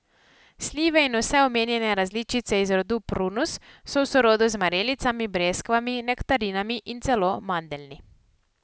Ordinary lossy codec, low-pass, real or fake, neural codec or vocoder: none; none; real; none